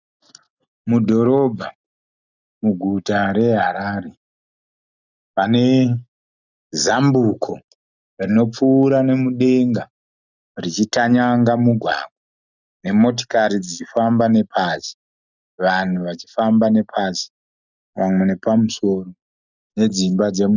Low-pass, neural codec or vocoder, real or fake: 7.2 kHz; none; real